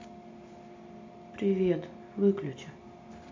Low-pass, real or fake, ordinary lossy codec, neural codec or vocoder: 7.2 kHz; real; MP3, 64 kbps; none